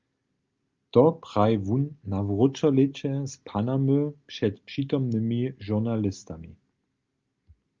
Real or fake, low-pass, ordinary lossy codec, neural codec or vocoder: real; 7.2 kHz; Opus, 32 kbps; none